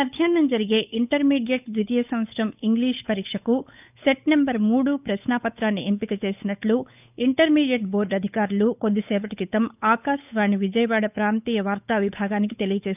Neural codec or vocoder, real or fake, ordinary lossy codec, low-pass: codec, 16 kHz, 8 kbps, FunCodec, trained on Chinese and English, 25 frames a second; fake; none; 3.6 kHz